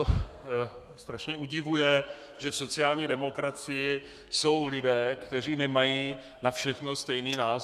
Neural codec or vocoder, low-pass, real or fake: codec, 32 kHz, 1.9 kbps, SNAC; 14.4 kHz; fake